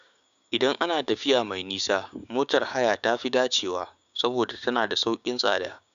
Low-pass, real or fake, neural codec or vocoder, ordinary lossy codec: 7.2 kHz; fake; codec, 16 kHz, 6 kbps, DAC; none